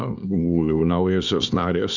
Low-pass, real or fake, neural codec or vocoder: 7.2 kHz; fake; codec, 24 kHz, 0.9 kbps, WavTokenizer, small release